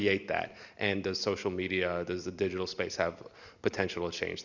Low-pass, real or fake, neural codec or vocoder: 7.2 kHz; real; none